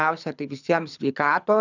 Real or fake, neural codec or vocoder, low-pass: fake; codec, 24 kHz, 6 kbps, HILCodec; 7.2 kHz